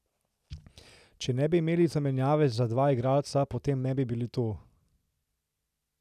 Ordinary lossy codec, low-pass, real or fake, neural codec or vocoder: none; 14.4 kHz; real; none